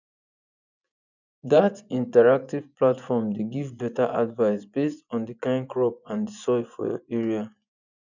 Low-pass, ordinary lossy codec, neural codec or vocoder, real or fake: 7.2 kHz; none; vocoder, 24 kHz, 100 mel bands, Vocos; fake